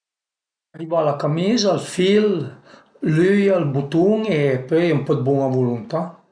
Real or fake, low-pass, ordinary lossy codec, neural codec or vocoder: real; 9.9 kHz; none; none